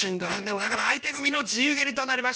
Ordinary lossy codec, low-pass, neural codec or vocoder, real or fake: none; none; codec, 16 kHz, about 1 kbps, DyCAST, with the encoder's durations; fake